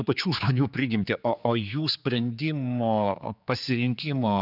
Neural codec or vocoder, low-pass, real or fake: codec, 16 kHz, 4 kbps, X-Codec, HuBERT features, trained on general audio; 5.4 kHz; fake